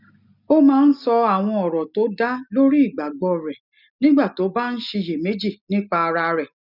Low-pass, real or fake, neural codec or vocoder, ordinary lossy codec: 5.4 kHz; real; none; none